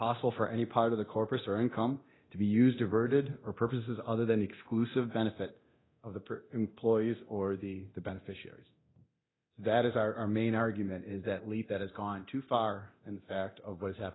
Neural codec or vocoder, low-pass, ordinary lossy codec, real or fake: codec, 24 kHz, 0.9 kbps, DualCodec; 7.2 kHz; AAC, 16 kbps; fake